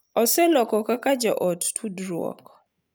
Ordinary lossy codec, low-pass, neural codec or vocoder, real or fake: none; none; none; real